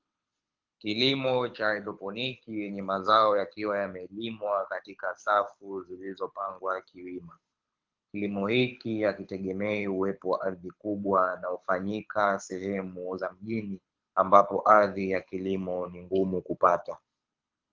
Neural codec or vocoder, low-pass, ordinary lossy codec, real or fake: codec, 24 kHz, 6 kbps, HILCodec; 7.2 kHz; Opus, 32 kbps; fake